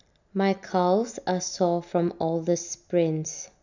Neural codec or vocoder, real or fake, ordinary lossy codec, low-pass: none; real; none; 7.2 kHz